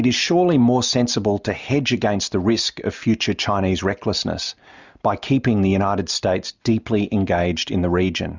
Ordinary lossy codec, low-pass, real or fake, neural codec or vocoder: Opus, 64 kbps; 7.2 kHz; real; none